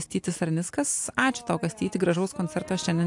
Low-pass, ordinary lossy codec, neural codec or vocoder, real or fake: 10.8 kHz; AAC, 64 kbps; none; real